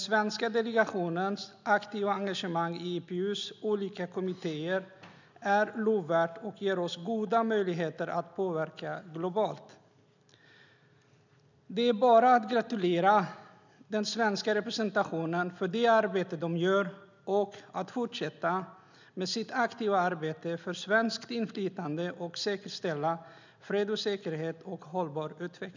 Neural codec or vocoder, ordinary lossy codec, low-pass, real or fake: none; none; 7.2 kHz; real